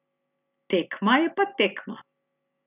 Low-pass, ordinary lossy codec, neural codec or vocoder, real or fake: 3.6 kHz; none; none; real